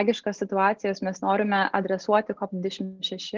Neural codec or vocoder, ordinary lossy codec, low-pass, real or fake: none; Opus, 16 kbps; 7.2 kHz; real